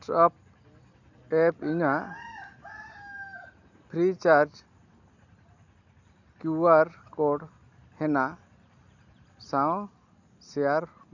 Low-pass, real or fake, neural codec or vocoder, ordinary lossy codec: 7.2 kHz; real; none; none